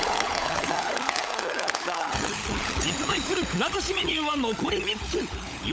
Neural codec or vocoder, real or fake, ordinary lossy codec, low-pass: codec, 16 kHz, 16 kbps, FunCodec, trained on LibriTTS, 50 frames a second; fake; none; none